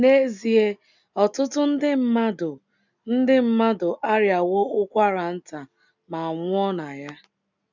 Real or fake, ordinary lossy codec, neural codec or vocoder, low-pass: real; none; none; 7.2 kHz